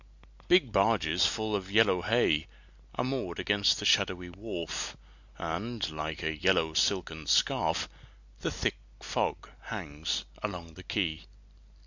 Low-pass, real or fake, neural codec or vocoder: 7.2 kHz; real; none